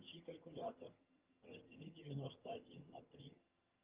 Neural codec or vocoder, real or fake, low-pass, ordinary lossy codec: vocoder, 22.05 kHz, 80 mel bands, HiFi-GAN; fake; 3.6 kHz; Opus, 24 kbps